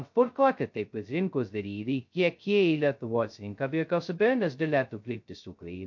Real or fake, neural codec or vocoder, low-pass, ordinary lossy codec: fake; codec, 16 kHz, 0.2 kbps, FocalCodec; 7.2 kHz; MP3, 48 kbps